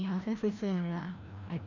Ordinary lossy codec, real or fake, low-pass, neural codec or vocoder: none; fake; 7.2 kHz; codec, 16 kHz, 1 kbps, FreqCodec, larger model